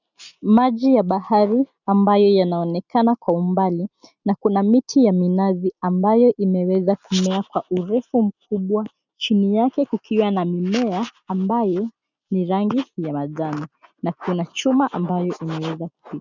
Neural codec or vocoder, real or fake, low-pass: none; real; 7.2 kHz